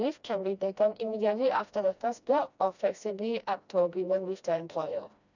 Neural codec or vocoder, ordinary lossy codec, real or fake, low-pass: codec, 16 kHz, 1 kbps, FreqCodec, smaller model; none; fake; 7.2 kHz